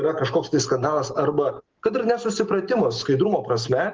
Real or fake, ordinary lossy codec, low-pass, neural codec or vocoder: real; Opus, 16 kbps; 7.2 kHz; none